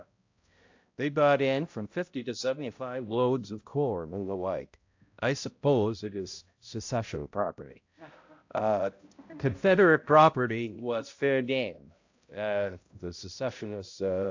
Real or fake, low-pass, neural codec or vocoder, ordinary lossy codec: fake; 7.2 kHz; codec, 16 kHz, 0.5 kbps, X-Codec, HuBERT features, trained on balanced general audio; AAC, 48 kbps